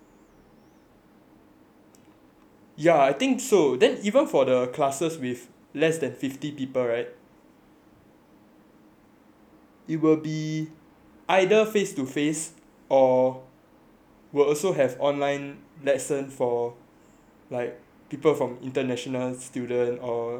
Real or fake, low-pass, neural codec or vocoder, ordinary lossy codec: real; 19.8 kHz; none; none